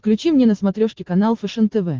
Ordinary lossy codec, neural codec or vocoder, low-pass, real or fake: Opus, 16 kbps; none; 7.2 kHz; real